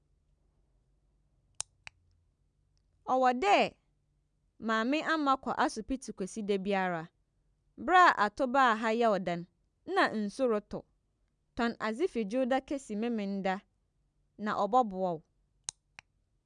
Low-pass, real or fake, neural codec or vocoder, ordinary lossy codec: 9.9 kHz; real; none; none